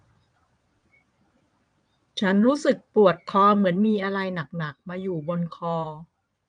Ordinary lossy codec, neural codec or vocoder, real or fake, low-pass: none; vocoder, 22.05 kHz, 80 mel bands, Vocos; fake; 9.9 kHz